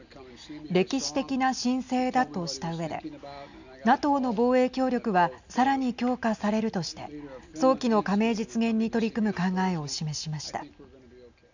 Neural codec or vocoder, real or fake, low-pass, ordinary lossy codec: none; real; 7.2 kHz; none